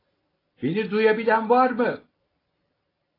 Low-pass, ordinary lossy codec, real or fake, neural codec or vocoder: 5.4 kHz; AAC, 24 kbps; real; none